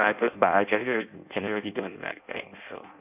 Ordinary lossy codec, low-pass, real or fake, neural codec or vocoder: none; 3.6 kHz; fake; codec, 16 kHz in and 24 kHz out, 0.6 kbps, FireRedTTS-2 codec